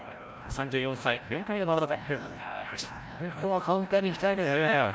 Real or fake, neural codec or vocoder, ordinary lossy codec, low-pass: fake; codec, 16 kHz, 0.5 kbps, FreqCodec, larger model; none; none